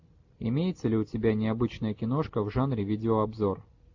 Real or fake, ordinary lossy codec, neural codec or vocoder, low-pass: real; AAC, 48 kbps; none; 7.2 kHz